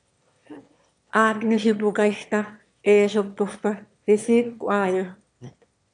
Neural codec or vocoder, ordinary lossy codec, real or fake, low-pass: autoencoder, 22.05 kHz, a latent of 192 numbers a frame, VITS, trained on one speaker; MP3, 64 kbps; fake; 9.9 kHz